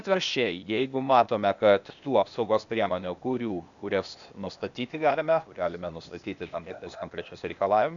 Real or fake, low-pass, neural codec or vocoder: fake; 7.2 kHz; codec, 16 kHz, 0.8 kbps, ZipCodec